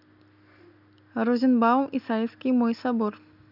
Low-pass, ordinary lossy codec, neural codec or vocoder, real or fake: 5.4 kHz; none; none; real